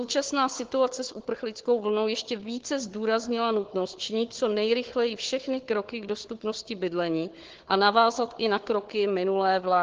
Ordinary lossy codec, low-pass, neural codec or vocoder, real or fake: Opus, 16 kbps; 7.2 kHz; codec, 16 kHz, 4 kbps, FunCodec, trained on Chinese and English, 50 frames a second; fake